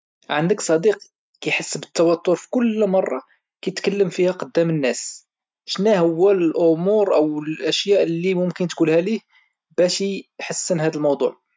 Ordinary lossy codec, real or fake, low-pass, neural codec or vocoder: none; real; none; none